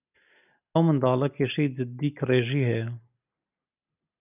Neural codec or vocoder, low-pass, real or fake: none; 3.6 kHz; real